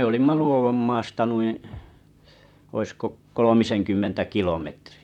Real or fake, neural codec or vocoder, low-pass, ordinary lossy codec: fake; vocoder, 44.1 kHz, 128 mel bands, Pupu-Vocoder; 19.8 kHz; none